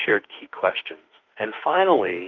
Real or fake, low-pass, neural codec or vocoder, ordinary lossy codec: fake; 7.2 kHz; autoencoder, 48 kHz, 32 numbers a frame, DAC-VAE, trained on Japanese speech; Opus, 32 kbps